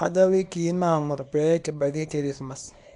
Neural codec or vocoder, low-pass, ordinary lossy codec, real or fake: codec, 24 kHz, 0.9 kbps, WavTokenizer, small release; 10.8 kHz; none; fake